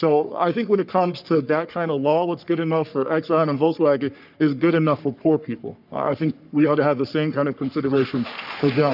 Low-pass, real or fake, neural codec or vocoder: 5.4 kHz; fake; codec, 44.1 kHz, 3.4 kbps, Pupu-Codec